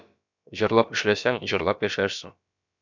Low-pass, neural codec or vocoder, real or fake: 7.2 kHz; codec, 16 kHz, about 1 kbps, DyCAST, with the encoder's durations; fake